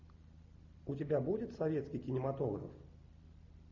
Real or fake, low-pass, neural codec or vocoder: real; 7.2 kHz; none